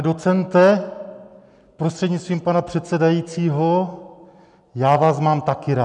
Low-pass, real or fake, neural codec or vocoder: 10.8 kHz; real; none